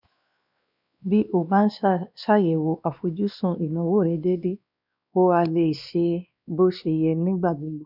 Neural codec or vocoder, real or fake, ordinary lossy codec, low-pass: codec, 16 kHz, 2 kbps, X-Codec, WavLM features, trained on Multilingual LibriSpeech; fake; none; 5.4 kHz